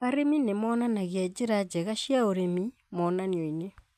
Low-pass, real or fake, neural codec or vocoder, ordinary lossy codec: 14.4 kHz; real; none; AAC, 96 kbps